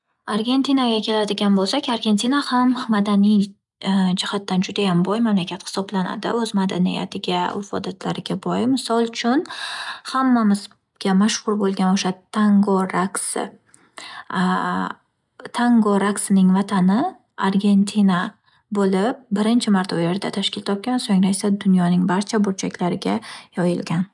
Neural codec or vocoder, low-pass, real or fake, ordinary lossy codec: none; 10.8 kHz; real; none